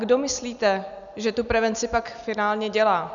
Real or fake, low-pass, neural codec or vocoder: real; 7.2 kHz; none